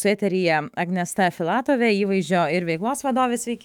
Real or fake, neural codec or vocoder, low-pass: fake; autoencoder, 48 kHz, 128 numbers a frame, DAC-VAE, trained on Japanese speech; 19.8 kHz